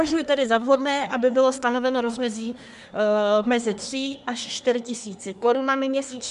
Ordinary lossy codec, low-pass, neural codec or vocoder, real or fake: AAC, 96 kbps; 10.8 kHz; codec, 24 kHz, 1 kbps, SNAC; fake